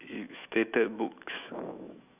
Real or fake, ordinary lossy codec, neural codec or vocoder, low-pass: fake; none; autoencoder, 48 kHz, 128 numbers a frame, DAC-VAE, trained on Japanese speech; 3.6 kHz